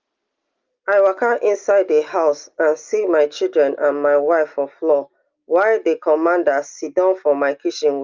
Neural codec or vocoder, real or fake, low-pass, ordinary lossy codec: none; real; 7.2 kHz; Opus, 24 kbps